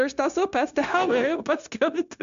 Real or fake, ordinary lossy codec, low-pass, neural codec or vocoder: fake; MP3, 64 kbps; 7.2 kHz; codec, 16 kHz, 0.9 kbps, LongCat-Audio-Codec